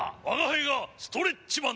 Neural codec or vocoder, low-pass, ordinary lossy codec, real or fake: none; none; none; real